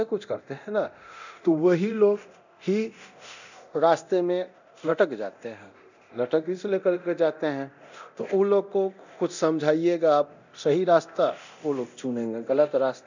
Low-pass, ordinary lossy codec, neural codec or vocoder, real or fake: 7.2 kHz; none; codec, 24 kHz, 0.9 kbps, DualCodec; fake